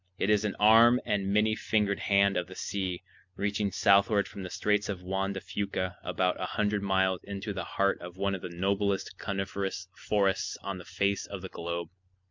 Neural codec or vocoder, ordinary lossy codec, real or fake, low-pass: none; MP3, 64 kbps; real; 7.2 kHz